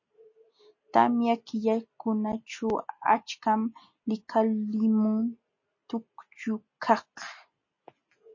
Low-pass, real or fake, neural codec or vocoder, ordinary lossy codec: 7.2 kHz; real; none; MP3, 32 kbps